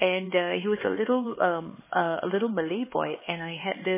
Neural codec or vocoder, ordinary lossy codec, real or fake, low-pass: codec, 16 kHz, 4 kbps, X-Codec, HuBERT features, trained on LibriSpeech; MP3, 16 kbps; fake; 3.6 kHz